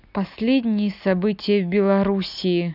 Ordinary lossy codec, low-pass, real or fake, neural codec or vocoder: none; 5.4 kHz; real; none